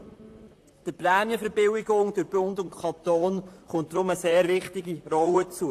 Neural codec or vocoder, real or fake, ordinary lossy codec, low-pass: vocoder, 44.1 kHz, 128 mel bands, Pupu-Vocoder; fake; AAC, 64 kbps; 14.4 kHz